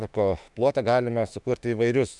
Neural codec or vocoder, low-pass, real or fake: autoencoder, 48 kHz, 32 numbers a frame, DAC-VAE, trained on Japanese speech; 10.8 kHz; fake